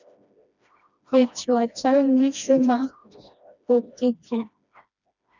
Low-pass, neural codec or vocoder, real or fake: 7.2 kHz; codec, 16 kHz, 1 kbps, FreqCodec, smaller model; fake